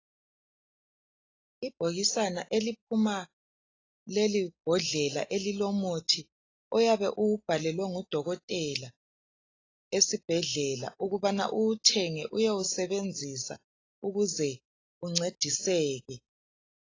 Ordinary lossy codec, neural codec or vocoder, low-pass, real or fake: AAC, 32 kbps; none; 7.2 kHz; real